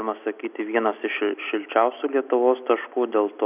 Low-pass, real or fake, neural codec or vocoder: 3.6 kHz; real; none